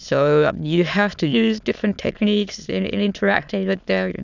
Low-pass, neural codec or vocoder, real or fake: 7.2 kHz; autoencoder, 22.05 kHz, a latent of 192 numbers a frame, VITS, trained on many speakers; fake